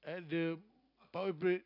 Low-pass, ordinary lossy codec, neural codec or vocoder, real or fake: 5.4 kHz; none; none; real